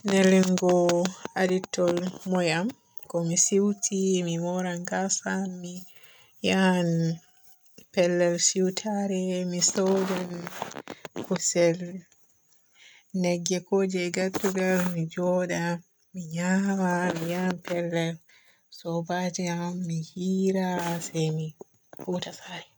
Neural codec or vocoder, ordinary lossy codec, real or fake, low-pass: none; none; real; none